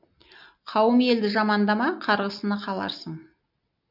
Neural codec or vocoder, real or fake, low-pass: none; real; 5.4 kHz